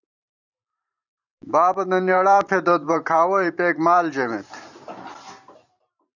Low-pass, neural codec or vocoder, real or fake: 7.2 kHz; vocoder, 44.1 kHz, 128 mel bands every 512 samples, BigVGAN v2; fake